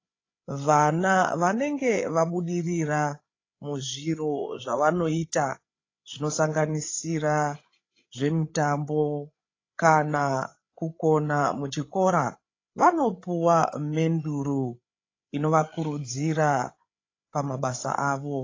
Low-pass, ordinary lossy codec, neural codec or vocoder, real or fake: 7.2 kHz; AAC, 32 kbps; codec, 16 kHz, 8 kbps, FreqCodec, larger model; fake